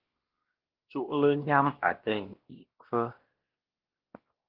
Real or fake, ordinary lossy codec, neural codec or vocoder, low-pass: fake; Opus, 16 kbps; codec, 16 kHz, 1 kbps, X-Codec, WavLM features, trained on Multilingual LibriSpeech; 5.4 kHz